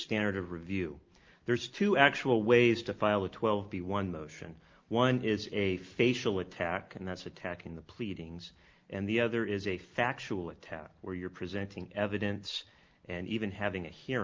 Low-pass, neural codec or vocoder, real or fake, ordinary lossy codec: 7.2 kHz; none; real; Opus, 32 kbps